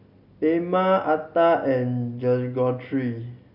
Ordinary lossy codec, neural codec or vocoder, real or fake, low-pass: none; none; real; 5.4 kHz